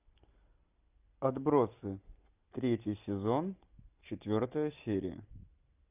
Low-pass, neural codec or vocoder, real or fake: 3.6 kHz; none; real